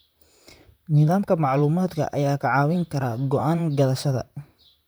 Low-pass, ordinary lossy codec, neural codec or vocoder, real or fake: none; none; vocoder, 44.1 kHz, 128 mel bands, Pupu-Vocoder; fake